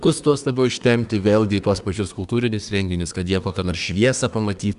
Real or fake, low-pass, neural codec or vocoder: fake; 10.8 kHz; codec, 24 kHz, 1 kbps, SNAC